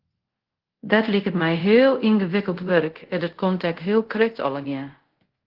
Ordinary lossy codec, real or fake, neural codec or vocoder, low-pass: Opus, 16 kbps; fake; codec, 24 kHz, 0.5 kbps, DualCodec; 5.4 kHz